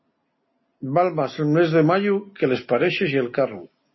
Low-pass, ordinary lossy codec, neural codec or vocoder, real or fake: 7.2 kHz; MP3, 24 kbps; none; real